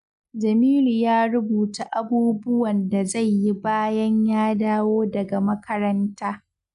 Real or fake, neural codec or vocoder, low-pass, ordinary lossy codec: real; none; 10.8 kHz; none